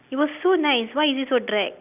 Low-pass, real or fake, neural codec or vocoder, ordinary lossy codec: 3.6 kHz; real; none; none